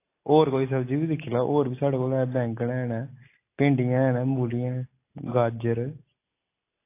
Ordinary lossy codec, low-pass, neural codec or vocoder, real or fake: AAC, 24 kbps; 3.6 kHz; none; real